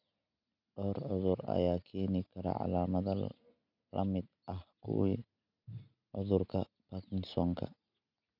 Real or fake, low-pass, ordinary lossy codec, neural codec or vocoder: real; 5.4 kHz; none; none